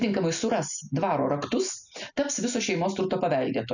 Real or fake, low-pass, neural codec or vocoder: real; 7.2 kHz; none